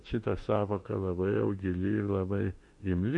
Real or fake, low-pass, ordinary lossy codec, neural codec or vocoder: fake; 10.8 kHz; MP3, 48 kbps; autoencoder, 48 kHz, 32 numbers a frame, DAC-VAE, trained on Japanese speech